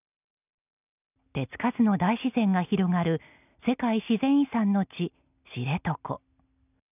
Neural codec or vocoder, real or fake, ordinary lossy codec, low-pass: none; real; none; 3.6 kHz